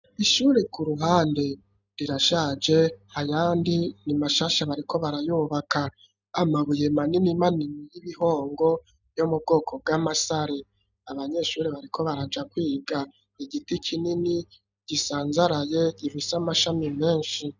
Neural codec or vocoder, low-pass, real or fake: none; 7.2 kHz; real